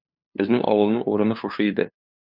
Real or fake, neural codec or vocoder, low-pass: fake; codec, 16 kHz, 2 kbps, FunCodec, trained on LibriTTS, 25 frames a second; 5.4 kHz